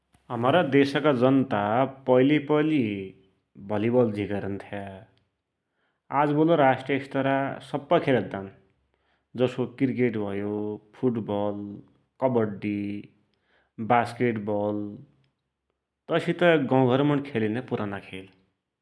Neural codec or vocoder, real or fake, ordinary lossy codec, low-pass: none; real; none; none